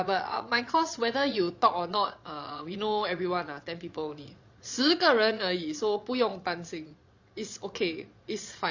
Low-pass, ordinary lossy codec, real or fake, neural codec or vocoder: 7.2 kHz; Opus, 64 kbps; fake; vocoder, 22.05 kHz, 80 mel bands, Vocos